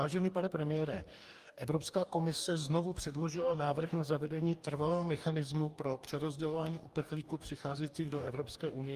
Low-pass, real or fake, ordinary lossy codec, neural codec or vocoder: 14.4 kHz; fake; Opus, 32 kbps; codec, 44.1 kHz, 2.6 kbps, DAC